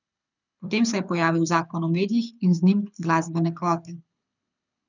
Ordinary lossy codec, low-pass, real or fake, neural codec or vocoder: none; 7.2 kHz; fake; codec, 24 kHz, 6 kbps, HILCodec